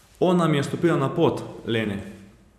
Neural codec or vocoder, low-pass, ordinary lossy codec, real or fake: vocoder, 44.1 kHz, 128 mel bands every 256 samples, BigVGAN v2; 14.4 kHz; none; fake